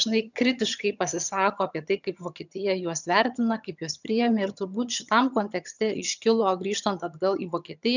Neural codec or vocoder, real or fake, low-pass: vocoder, 22.05 kHz, 80 mel bands, HiFi-GAN; fake; 7.2 kHz